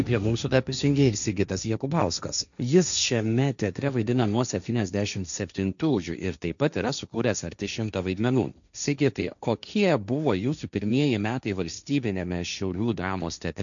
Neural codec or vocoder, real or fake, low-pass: codec, 16 kHz, 1.1 kbps, Voila-Tokenizer; fake; 7.2 kHz